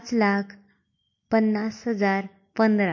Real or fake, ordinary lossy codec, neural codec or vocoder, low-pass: real; MP3, 32 kbps; none; 7.2 kHz